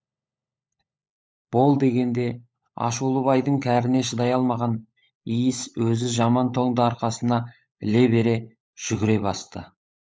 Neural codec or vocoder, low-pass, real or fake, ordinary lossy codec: codec, 16 kHz, 16 kbps, FunCodec, trained on LibriTTS, 50 frames a second; none; fake; none